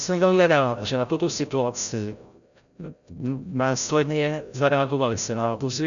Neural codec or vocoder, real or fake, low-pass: codec, 16 kHz, 0.5 kbps, FreqCodec, larger model; fake; 7.2 kHz